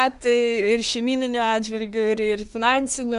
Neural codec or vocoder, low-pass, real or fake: codec, 24 kHz, 1 kbps, SNAC; 10.8 kHz; fake